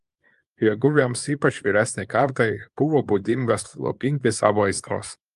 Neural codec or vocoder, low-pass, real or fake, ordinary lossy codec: codec, 24 kHz, 0.9 kbps, WavTokenizer, small release; 10.8 kHz; fake; Opus, 32 kbps